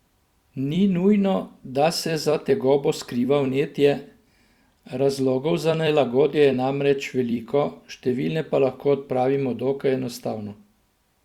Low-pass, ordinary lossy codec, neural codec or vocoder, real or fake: 19.8 kHz; Opus, 64 kbps; vocoder, 44.1 kHz, 128 mel bands every 256 samples, BigVGAN v2; fake